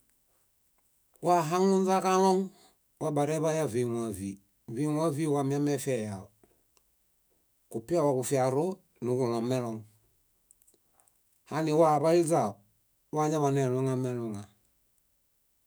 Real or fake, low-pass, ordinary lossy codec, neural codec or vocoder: fake; none; none; autoencoder, 48 kHz, 128 numbers a frame, DAC-VAE, trained on Japanese speech